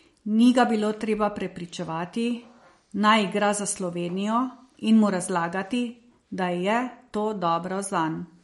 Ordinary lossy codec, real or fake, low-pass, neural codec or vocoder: MP3, 48 kbps; real; 19.8 kHz; none